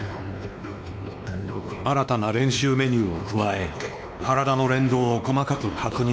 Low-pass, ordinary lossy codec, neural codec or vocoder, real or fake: none; none; codec, 16 kHz, 2 kbps, X-Codec, WavLM features, trained on Multilingual LibriSpeech; fake